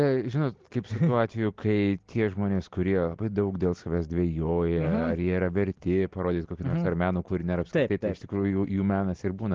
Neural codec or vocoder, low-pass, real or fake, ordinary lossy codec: none; 7.2 kHz; real; Opus, 16 kbps